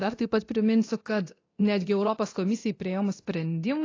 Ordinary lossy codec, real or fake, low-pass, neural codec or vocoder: AAC, 32 kbps; fake; 7.2 kHz; codec, 24 kHz, 0.9 kbps, DualCodec